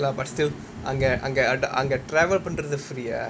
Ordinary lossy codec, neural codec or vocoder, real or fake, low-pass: none; none; real; none